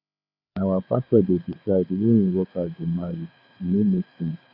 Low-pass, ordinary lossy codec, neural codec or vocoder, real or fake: 5.4 kHz; none; codec, 16 kHz, 4 kbps, FreqCodec, larger model; fake